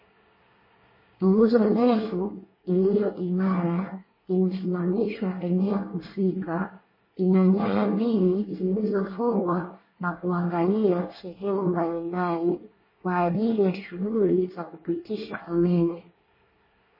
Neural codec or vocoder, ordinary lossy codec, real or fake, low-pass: codec, 24 kHz, 1 kbps, SNAC; MP3, 24 kbps; fake; 5.4 kHz